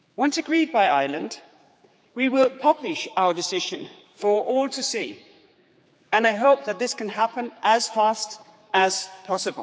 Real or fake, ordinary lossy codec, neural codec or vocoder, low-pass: fake; none; codec, 16 kHz, 4 kbps, X-Codec, HuBERT features, trained on general audio; none